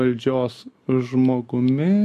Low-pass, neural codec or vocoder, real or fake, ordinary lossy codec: 14.4 kHz; none; real; MP3, 64 kbps